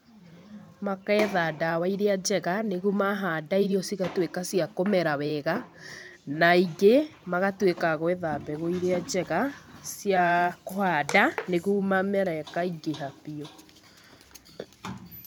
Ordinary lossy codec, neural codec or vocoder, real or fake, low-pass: none; vocoder, 44.1 kHz, 128 mel bands every 512 samples, BigVGAN v2; fake; none